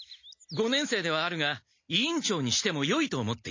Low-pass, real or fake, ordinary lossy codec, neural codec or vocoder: 7.2 kHz; real; MP3, 32 kbps; none